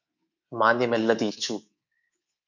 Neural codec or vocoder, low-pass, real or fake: codec, 24 kHz, 3.1 kbps, DualCodec; 7.2 kHz; fake